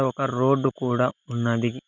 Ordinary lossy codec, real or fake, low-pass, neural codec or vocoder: none; real; 7.2 kHz; none